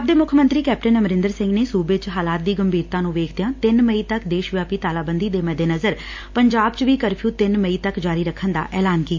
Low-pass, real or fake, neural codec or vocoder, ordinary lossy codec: 7.2 kHz; real; none; MP3, 48 kbps